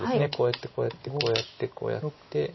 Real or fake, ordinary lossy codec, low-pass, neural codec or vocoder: real; MP3, 24 kbps; 7.2 kHz; none